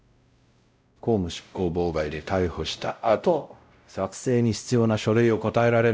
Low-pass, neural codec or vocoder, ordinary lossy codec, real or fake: none; codec, 16 kHz, 0.5 kbps, X-Codec, WavLM features, trained on Multilingual LibriSpeech; none; fake